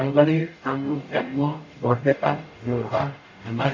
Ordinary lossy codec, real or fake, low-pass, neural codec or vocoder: AAC, 48 kbps; fake; 7.2 kHz; codec, 44.1 kHz, 0.9 kbps, DAC